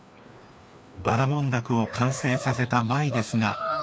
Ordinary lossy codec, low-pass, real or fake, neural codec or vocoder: none; none; fake; codec, 16 kHz, 2 kbps, FreqCodec, larger model